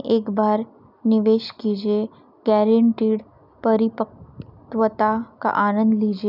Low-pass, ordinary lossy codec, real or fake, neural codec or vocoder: 5.4 kHz; none; real; none